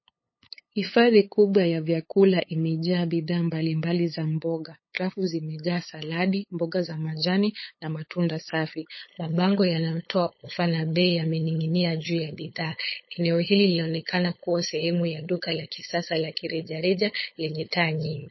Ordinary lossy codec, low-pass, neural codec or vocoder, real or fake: MP3, 24 kbps; 7.2 kHz; codec, 16 kHz, 8 kbps, FunCodec, trained on LibriTTS, 25 frames a second; fake